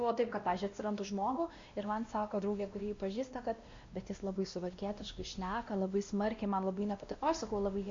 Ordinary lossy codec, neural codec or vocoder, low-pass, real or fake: MP3, 48 kbps; codec, 16 kHz, 1 kbps, X-Codec, WavLM features, trained on Multilingual LibriSpeech; 7.2 kHz; fake